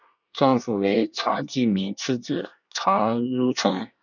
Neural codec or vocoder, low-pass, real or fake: codec, 24 kHz, 1 kbps, SNAC; 7.2 kHz; fake